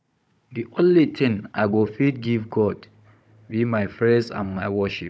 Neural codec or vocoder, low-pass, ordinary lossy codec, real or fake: codec, 16 kHz, 16 kbps, FunCodec, trained on Chinese and English, 50 frames a second; none; none; fake